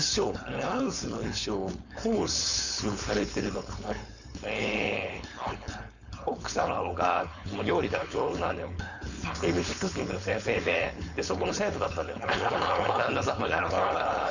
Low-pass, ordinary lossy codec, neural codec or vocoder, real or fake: 7.2 kHz; none; codec, 16 kHz, 4.8 kbps, FACodec; fake